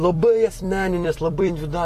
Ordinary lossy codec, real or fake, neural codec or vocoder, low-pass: AAC, 64 kbps; fake; vocoder, 44.1 kHz, 128 mel bands every 256 samples, BigVGAN v2; 14.4 kHz